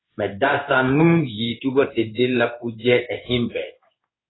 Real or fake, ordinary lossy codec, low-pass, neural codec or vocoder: fake; AAC, 16 kbps; 7.2 kHz; codec, 16 kHz in and 24 kHz out, 1 kbps, XY-Tokenizer